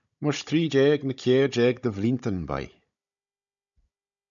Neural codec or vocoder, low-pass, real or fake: codec, 16 kHz, 16 kbps, FunCodec, trained on Chinese and English, 50 frames a second; 7.2 kHz; fake